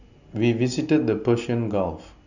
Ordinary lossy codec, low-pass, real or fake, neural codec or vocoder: none; 7.2 kHz; real; none